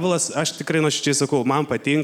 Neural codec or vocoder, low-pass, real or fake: none; 19.8 kHz; real